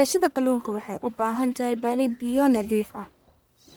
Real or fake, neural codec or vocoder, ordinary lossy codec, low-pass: fake; codec, 44.1 kHz, 1.7 kbps, Pupu-Codec; none; none